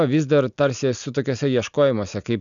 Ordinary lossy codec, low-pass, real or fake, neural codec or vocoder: MP3, 96 kbps; 7.2 kHz; real; none